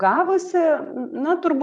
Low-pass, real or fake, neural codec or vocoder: 9.9 kHz; fake; vocoder, 22.05 kHz, 80 mel bands, Vocos